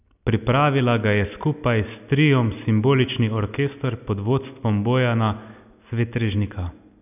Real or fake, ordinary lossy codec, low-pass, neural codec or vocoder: real; none; 3.6 kHz; none